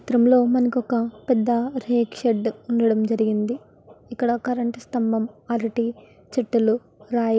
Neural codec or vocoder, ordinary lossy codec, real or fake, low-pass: none; none; real; none